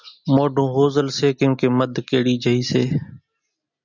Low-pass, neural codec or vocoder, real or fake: 7.2 kHz; none; real